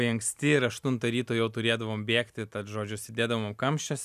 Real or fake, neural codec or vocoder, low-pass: real; none; 14.4 kHz